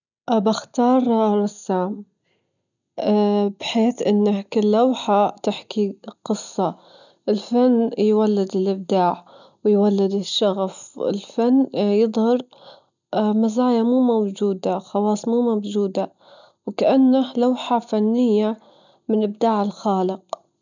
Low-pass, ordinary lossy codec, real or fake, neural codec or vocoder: 7.2 kHz; none; real; none